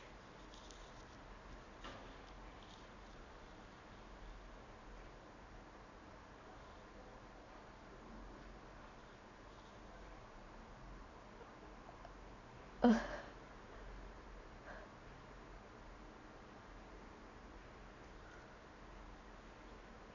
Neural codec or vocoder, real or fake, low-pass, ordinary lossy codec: none; real; 7.2 kHz; none